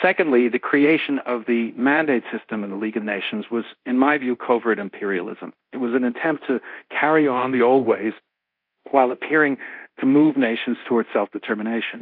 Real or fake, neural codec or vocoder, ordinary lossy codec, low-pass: fake; codec, 24 kHz, 0.9 kbps, DualCodec; AAC, 48 kbps; 5.4 kHz